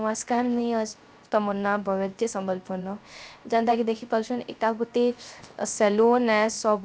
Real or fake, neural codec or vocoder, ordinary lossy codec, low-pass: fake; codec, 16 kHz, 0.3 kbps, FocalCodec; none; none